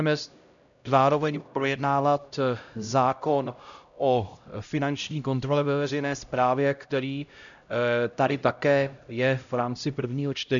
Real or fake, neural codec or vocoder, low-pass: fake; codec, 16 kHz, 0.5 kbps, X-Codec, HuBERT features, trained on LibriSpeech; 7.2 kHz